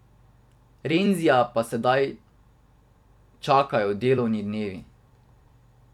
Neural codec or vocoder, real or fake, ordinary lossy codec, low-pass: vocoder, 44.1 kHz, 128 mel bands every 256 samples, BigVGAN v2; fake; none; 19.8 kHz